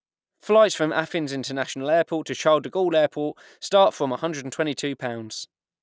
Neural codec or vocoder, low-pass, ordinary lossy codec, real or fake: none; none; none; real